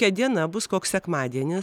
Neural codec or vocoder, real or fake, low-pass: none; real; 19.8 kHz